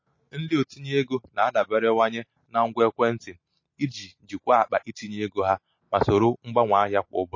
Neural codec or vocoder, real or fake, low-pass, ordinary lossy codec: none; real; 7.2 kHz; MP3, 32 kbps